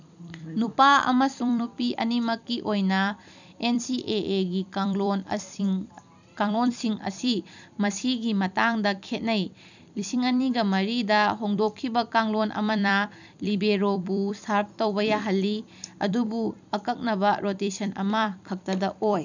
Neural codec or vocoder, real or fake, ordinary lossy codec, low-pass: vocoder, 44.1 kHz, 128 mel bands every 256 samples, BigVGAN v2; fake; none; 7.2 kHz